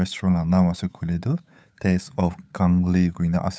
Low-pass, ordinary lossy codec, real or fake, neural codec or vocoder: none; none; fake; codec, 16 kHz, 8 kbps, FunCodec, trained on Chinese and English, 25 frames a second